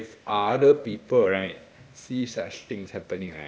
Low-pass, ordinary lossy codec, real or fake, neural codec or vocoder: none; none; fake; codec, 16 kHz, 0.8 kbps, ZipCodec